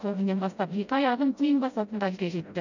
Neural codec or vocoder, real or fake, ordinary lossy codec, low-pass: codec, 16 kHz, 0.5 kbps, FreqCodec, smaller model; fake; none; 7.2 kHz